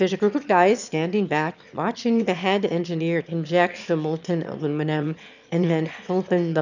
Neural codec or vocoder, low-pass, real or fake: autoencoder, 22.05 kHz, a latent of 192 numbers a frame, VITS, trained on one speaker; 7.2 kHz; fake